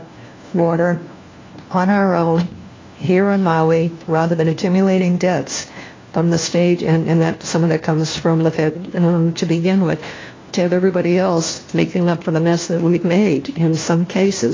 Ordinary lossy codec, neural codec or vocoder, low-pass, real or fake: AAC, 32 kbps; codec, 16 kHz, 1 kbps, FunCodec, trained on LibriTTS, 50 frames a second; 7.2 kHz; fake